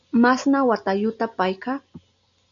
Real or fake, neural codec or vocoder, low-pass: real; none; 7.2 kHz